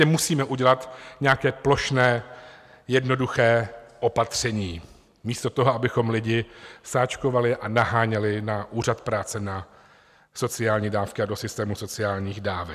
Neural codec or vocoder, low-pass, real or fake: none; 14.4 kHz; real